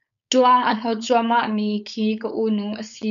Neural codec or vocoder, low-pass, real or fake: codec, 16 kHz, 4.8 kbps, FACodec; 7.2 kHz; fake